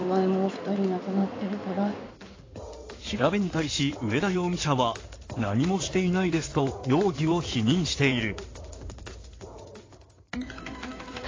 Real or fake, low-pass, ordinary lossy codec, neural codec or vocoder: fake; 7.2 kHz; AAC, 32 kbps; codec, 16 kHz in and 24 kHz out, 2.2 kbps, FireRedTTS-2 codec